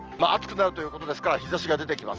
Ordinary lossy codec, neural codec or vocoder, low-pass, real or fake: Opus, 24 kbps; none; 7.2 kHz; real